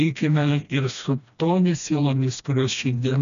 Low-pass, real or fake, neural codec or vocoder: 7.2 kHz; fake; codec, 16 kHz, 1 kbps, FreqCodec, smaller model